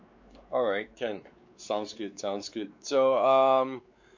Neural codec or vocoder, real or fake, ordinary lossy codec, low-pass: codec, 16 kHz, 4 kbps, X-Codec, WavLM features, trained on Multilingual LibriSpeech; fake; MP3, 48 kbps; 7.2 kHz